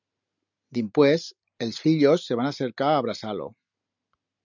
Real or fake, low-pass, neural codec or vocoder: real; 7.2 kHz; none